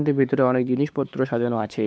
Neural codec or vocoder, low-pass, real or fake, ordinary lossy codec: codec, 16 kHz, 4 kbps, X-Codec, WavLM features, trained on Multilingual LibriSpeech; none; fake; none